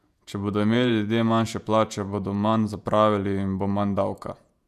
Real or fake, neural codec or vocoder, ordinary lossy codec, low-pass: fake; vocoder, 48 kHz, 128 mel bands, Vocos; none; 14.4 kHz